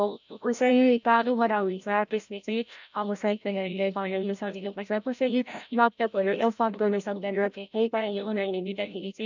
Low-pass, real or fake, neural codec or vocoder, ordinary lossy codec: 7.2 kHz; fake; codec, 16 kHz, 0.5 kbps, FreqCodec, larger model; none